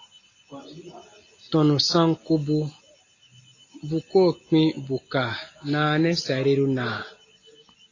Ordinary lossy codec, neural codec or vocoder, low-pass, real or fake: AAC, 32 kbps; none; 7.2 kHz; real